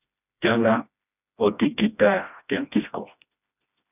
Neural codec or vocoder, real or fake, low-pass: codec, 16 kHz, 1 kbps, FreqCodec, smaller model; fake; 3.6 kHz